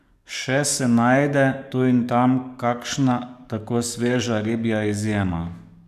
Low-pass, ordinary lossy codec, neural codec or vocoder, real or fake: 14.4 kHz; none; codec, 44.1 kHz, 7.8 kbps, DAC; fake